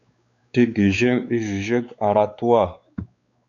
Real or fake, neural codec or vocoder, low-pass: fake; codec, 16 kHz, 4 kbps, X-Codec, WavLM features, trained on Multilingual LibriSpeech; 7.2 kHz